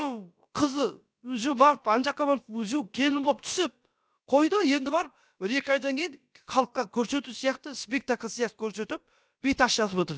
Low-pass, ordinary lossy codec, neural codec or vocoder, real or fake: none; none; codec, 16 kHz, about 1 kbps, DyCAST, with the encoder's durations; fake